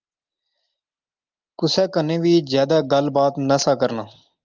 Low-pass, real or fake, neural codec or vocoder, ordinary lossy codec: 7.2 kHz; real; none; Opus, 32 kbps